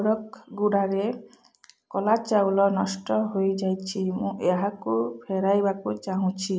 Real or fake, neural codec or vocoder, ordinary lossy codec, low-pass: real; none; none; none